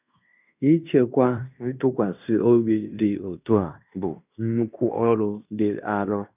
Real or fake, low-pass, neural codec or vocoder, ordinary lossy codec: fake; 3.6 kHz; codec, 16 kHz in and 24 kHz out, 0.9 kbps, LongCat-Audio-Codec, fine tuned four codebook decoder; none